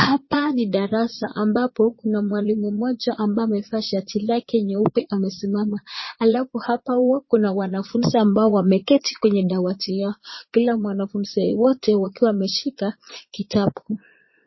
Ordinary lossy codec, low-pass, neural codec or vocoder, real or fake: MP3, 24 kbps; 7.2 kHz; vocoder, 22.05 kHz, 80 mel bands, Vocos; fake